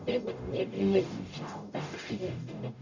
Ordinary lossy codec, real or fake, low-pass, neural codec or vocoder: none; fake; 7.2 kHz; codec, 44.1 kHz, 0.9 kbps, DAC